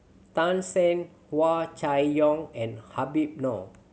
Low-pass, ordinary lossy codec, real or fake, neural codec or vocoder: none; none; real; none